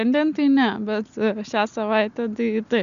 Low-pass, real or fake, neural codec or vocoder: 7.2 kHz; real; none